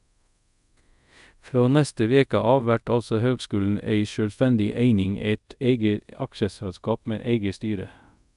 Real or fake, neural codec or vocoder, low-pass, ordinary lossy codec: fake; codec, 24 kHz, 0.5 kbps, DualCodec; 10.8 kHz; none